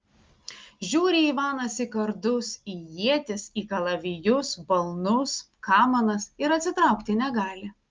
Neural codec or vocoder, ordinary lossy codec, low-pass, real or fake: none; Opus, 24 kbps; 7.2 kHz; real